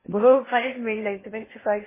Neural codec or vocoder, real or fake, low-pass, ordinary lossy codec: codec, 16 kHz in and 24 kHz out, 0.6 kbps, FocalCodec, streaming, 2048 codes; fake; 3.6 kHz; MP3, 16 kbps